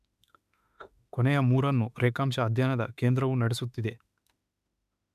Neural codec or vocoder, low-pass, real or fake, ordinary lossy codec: autoencoder, 48 kHz, 32 numbers a frame, DAC-VAE, trained on Japanese speech; 14.4 kHz; fake; none